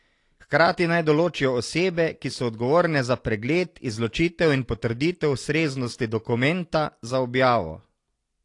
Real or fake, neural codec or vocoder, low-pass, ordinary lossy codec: real; none; 10.8 kHz; AAC, 48 kbps